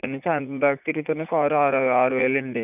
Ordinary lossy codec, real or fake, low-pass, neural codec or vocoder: none; fake; 3.6 kHz; vocoder, 44.1 kHz, 80 mel bands, Vocos